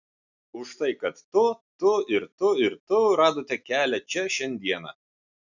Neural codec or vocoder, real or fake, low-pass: none; real; 7.2 kHz